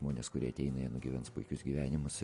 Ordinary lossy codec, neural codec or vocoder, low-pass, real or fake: MP3, 48 kbps; none; 14.4 kHz; real